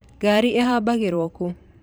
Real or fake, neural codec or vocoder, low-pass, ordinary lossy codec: fake; vocoder, 44.1 kHz, 128 mel bands every 512 samples, BigVGAN v2; none; none